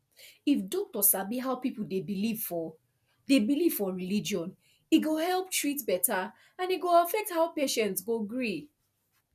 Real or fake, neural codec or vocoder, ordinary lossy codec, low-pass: real; none; none; 14.4 kHz